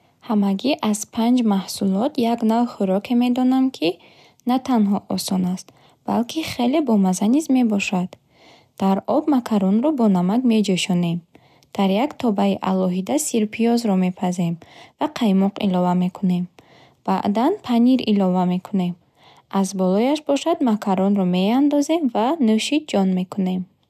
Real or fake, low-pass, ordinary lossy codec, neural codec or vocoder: real; 14.4 kHz; none; none